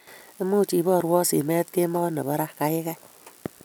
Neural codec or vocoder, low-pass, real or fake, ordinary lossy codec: none; none; real; none